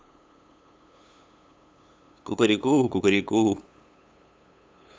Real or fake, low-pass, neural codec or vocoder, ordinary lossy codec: fake; none; codec, 16 kHz, 8 kbps, FunCodec, trained on LibriTTS, 25 frames a second; none